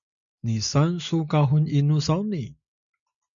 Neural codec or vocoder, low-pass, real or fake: none; 7.2 kHz; real